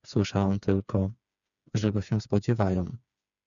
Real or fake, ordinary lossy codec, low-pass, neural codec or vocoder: fake; MP3, 96 kbps; 7.2 kHz; codec, 16 kHz, 4 kbps, FreqCodec, smaller model